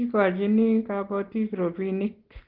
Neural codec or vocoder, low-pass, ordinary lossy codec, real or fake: none; 5.4 kHz; Opus, 16 kbps; real